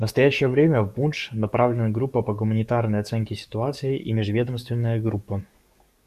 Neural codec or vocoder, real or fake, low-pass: codec, 44.1 kHz, 7.8 kbps, DAC; fake; 14.4 kHz